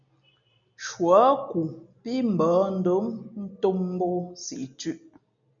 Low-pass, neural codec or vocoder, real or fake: 7.2 kHz; none; real